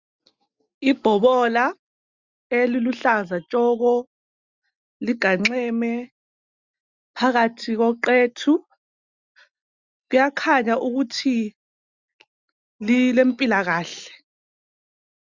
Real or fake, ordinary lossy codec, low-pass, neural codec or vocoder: real; Opus, 64 kbps; 7.2 kHz; none